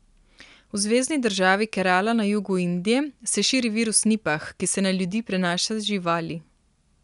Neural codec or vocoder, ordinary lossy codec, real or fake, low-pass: none; none; real; 10.8 kHz